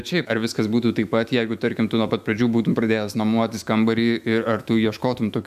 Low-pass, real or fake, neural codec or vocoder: 14.4 kHz; fake; autoencoder, 48 kHz, 128 numbers a frame, DAC-VAE, trained on Japanese speech